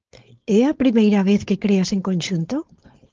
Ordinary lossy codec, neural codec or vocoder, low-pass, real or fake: Opus, 24 kbps; codec, 16 kHz, 4.8 kbps, FACodec; 7.2 kHz; fake